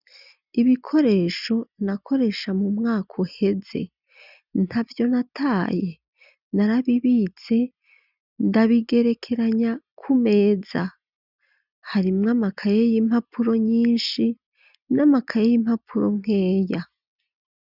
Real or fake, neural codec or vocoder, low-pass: real; none; 5.4 kHz